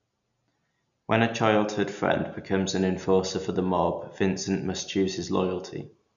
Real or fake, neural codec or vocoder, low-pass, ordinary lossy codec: real; none; 7.2 kHz; none